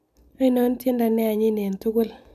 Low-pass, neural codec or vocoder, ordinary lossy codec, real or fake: 14.4 kHz; none; MP3, 96 kbps; real